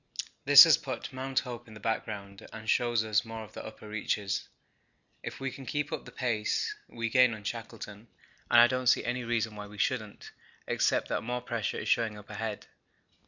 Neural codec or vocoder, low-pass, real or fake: none; 7.2 kHz; real